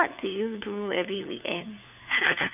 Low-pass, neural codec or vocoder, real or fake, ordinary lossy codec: 3.6 kHz; codec, 16 kHz, 4 kbps, FunCodec, trained on LibriTTS, 50 frames a second; fake; none